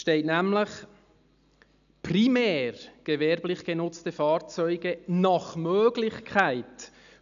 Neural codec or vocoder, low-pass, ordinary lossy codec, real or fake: none; 7.2 kHz; none; real